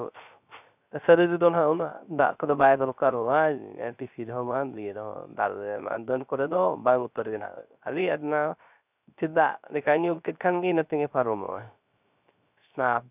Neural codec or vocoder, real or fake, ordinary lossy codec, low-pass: codec, 16 kHz, 0.3 kbps, FocalCodec; fake; none; 3.6 kHz